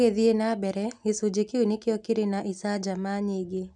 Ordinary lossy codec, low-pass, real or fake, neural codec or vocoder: none; 10.8 kHz; real; none